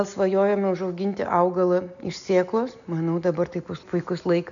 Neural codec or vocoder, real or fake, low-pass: none; real; 7.2 kHz